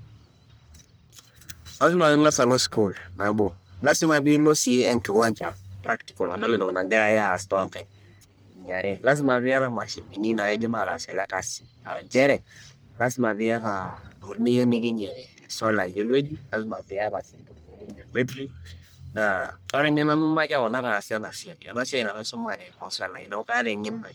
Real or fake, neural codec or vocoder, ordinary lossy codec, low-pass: fake; codec, 44.1 kHz, 1.7 kbps, Pupu-Codec; none; none